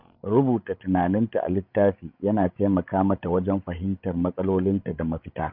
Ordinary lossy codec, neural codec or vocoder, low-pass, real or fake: none; codec, 16 kHz, 16 kbps, FreqCodec, larger model; 5.4 kHz; fake